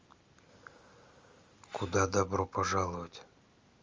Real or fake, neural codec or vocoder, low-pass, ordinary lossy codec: real; none; 7.2 kHz; Opus, 32 kbps